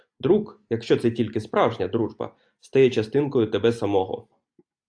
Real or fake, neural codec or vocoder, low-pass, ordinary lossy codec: real; none; 9.9 kHz; AAC, 64 kbps